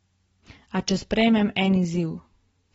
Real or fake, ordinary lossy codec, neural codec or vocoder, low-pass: real; AAC, 24 kbps; none; 19.8 kHz